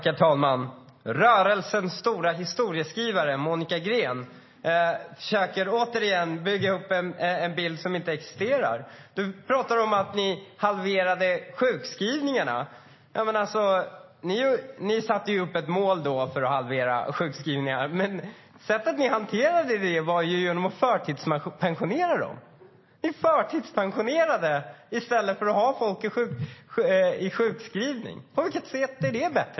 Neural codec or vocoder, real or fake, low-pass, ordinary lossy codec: none; real; 7.2 kHz; MP3, 24 kbps